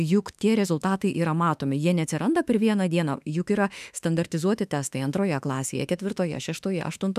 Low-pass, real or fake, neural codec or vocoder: 14.4 kHz; fake; autoencoder, 48 kHz, 32 numbers a frame, DAC-VAE, trained on Japanese speech